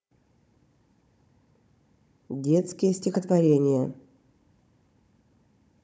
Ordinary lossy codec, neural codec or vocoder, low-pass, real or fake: none; codec, 16 kHz, 16 kbps, FunCodec, trained on Chinese and English, 50 frames a second; none; fake